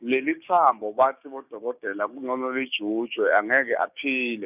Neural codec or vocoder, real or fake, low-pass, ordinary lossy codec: codec, 16 kHz, 6 kbps, DAC; fake; 3.6 kHz; none